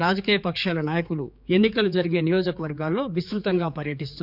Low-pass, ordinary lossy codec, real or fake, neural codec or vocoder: 5.4 kHz; none; fake; codec, 16 kHz, 4 kbps, X-Codec, HuBERT features, trained on general audio